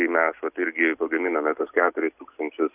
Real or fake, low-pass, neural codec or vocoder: real; 3.6 kHz; none